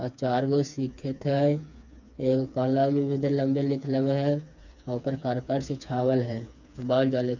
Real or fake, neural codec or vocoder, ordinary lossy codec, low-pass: fake; codec, 16 kHz, 4 kbps, FreqCodec, smaller model; none; 7.2 kHz